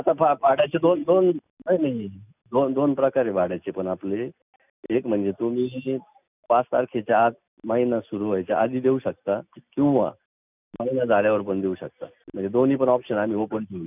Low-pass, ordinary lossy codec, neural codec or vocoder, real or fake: 3.6 kHz; none; none; real